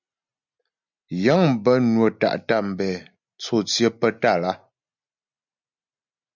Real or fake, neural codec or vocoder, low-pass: real; none; 7.2 kHz